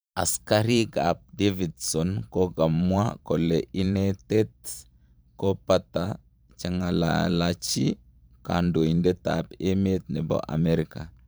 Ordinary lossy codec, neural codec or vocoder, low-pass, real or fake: none; vocoder, 44.1 kHz, 128 mel bands every 512 samples, BigVGAN v2; none; fake